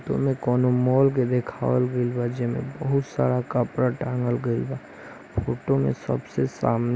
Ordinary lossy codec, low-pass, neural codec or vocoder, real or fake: none; none; none; real